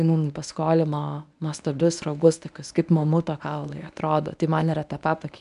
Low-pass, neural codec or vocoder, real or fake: 10.8 kHz; codec, 24 kHz, 0.9 kbps, WavTokenizer, medium speech release version 1; fake